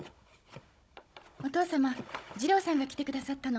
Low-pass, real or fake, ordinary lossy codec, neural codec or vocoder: none; fake; none; codec, 16 kHz, 16 kbps, FunCodec, trained on Chinese and English, 50 frames a second